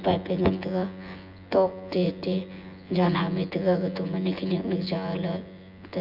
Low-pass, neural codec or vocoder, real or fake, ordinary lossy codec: 5.4 kHz; vocoder, 24 kHz, 100 mel bands, Vocos; fake; none